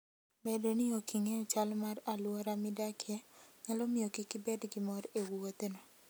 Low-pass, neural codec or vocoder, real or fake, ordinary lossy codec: none; none; real; none